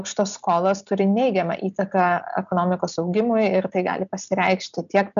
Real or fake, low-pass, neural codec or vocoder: real; 7.2 kHz; none